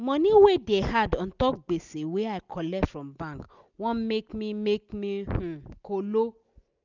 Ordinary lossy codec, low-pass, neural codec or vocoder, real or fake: none; 7.2 kHz; none; real